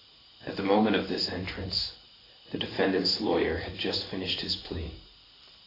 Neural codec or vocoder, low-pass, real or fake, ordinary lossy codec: codec, 16 kHz in and 24 kHz out, 1 kbps, XY-Tokenizer; 5.4 kHz; fake; AAC, 24 kbps